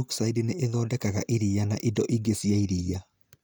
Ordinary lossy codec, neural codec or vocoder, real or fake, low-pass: none; none; real; none